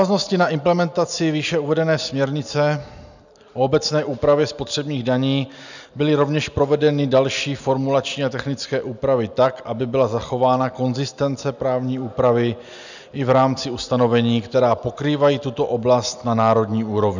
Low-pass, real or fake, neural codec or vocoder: 7.2 kHz; real; none